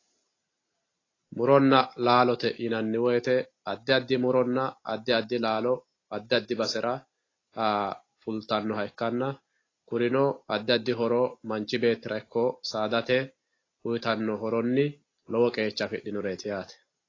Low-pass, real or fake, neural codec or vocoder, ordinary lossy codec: 7.2 kHz; real; none; AAC, 32 kbps